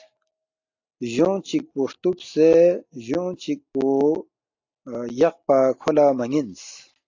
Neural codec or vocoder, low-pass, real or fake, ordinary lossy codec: none; 7.2 kHz; real; AAC, 48 kbps